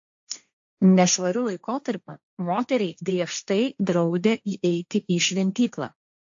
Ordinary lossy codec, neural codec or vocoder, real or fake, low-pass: AAC, 64 kbps; codec, 16 kHz, 1.1 kbps, Voila-Tokenizer; fake; 7.2 kHz